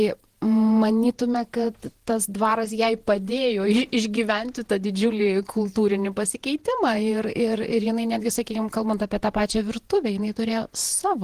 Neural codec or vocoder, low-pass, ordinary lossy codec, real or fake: vocoder, 48 kHz, 128 mel bands, Vocos; 14.4 kHz; Opus, 16 kbps; fake